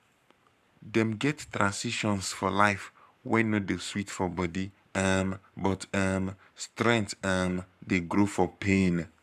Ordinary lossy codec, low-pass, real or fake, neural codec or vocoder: none; 14.4 kHz; fake; codec, 44.1 kHz, 7.8 kbps, Pupu-Codec